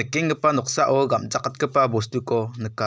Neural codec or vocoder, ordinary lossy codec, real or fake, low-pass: none; none; real; none